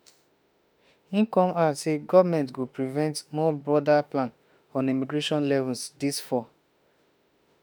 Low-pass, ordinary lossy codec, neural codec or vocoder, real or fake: none; none; autoencoder, 48 kHz, 32 numbers a frame, DAC-VAE, trained on Japanese speech; fake